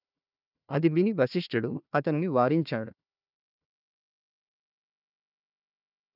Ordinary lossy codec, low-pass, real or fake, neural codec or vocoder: none; 5.4 kHz; fake; codec, 16 kHz, 1 kbps, FunCodec, trained on Chinese and English, 50 frames a second